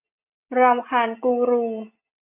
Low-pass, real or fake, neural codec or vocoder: 3.6 kHz; real; none